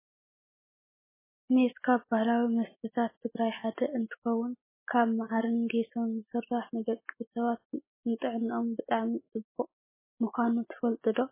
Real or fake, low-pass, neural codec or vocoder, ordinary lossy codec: real; 3.6 kHz; none; MP3, 16 kbps